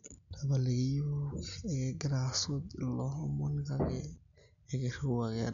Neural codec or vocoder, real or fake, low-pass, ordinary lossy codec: none; real; 7.2 kHz; none